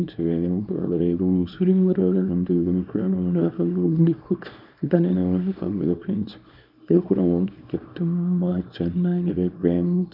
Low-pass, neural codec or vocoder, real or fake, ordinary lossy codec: 5.4 kHz; codec, 24 kHz, 0.9 kbps, WavTokenizer, small release; fake; none